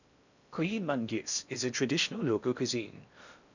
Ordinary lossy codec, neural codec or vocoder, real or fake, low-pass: none; codec, 16 kHz in and 24 kHz out, 0.6 kbps, FocalCodec, streaming, 4096 codes; fake; 7.2 kHz